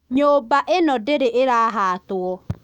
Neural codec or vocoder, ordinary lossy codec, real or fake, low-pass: autoencoder, 48 kHz, 128 numbers a frame, DAC-VAE, trained on Japanese speech; none; fake; 19.8 kHz